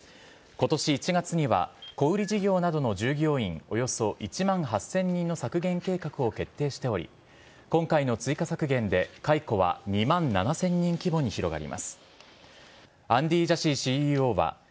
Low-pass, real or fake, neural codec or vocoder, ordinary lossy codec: none; real; none; none